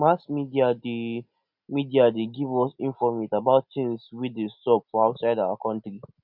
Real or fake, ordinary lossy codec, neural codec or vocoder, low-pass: real; none; none; 5.4 kHz